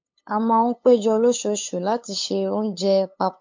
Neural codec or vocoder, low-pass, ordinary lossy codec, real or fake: codec, 16 kHz, 8 kbps, FunCodec, trained on LibriTTS, 25 frames a second; 7.2 kHz; MP3, 48 kbps; fake